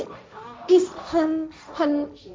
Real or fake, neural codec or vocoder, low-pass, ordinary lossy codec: fake; codec, 16 kHz, 1.1 kbps, Voila-Tokenizer; none; none